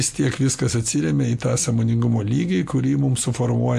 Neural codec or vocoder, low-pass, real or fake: none; 14.4 kHz; real